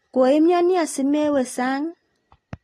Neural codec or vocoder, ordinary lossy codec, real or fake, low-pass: none; AAC, 32 kbps; real; 19.8 kHz